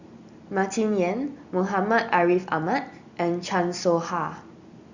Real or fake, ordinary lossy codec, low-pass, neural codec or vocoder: real; Opus, 64 kbps; 7.2 kHz; none